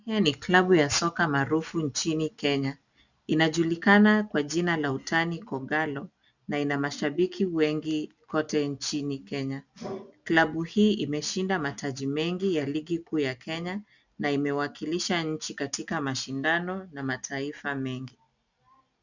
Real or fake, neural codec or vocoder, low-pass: real; none; 7.2 kHz